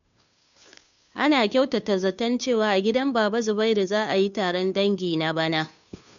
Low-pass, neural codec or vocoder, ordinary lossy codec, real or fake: 7.2 kHz; codec, 16 kHz, 2 kbps, FunCodec, trained on Chinese and English, 25 frames a second; none; fake